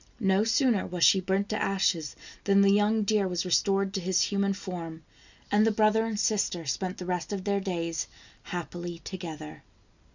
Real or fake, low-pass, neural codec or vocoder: real; 7.2 kHz; none